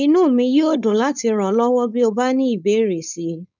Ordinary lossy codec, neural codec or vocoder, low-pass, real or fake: none; codec, 16 kHz, 4.8 kbps, FACodec; 7.2 kHz; fake